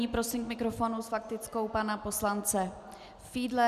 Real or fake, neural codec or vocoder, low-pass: real; none; 14.4 kHz